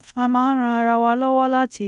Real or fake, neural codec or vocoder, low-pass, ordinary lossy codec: fake; codec, 24 kHz, 0.5 kbps, DualCodec; 10.8 kHz; none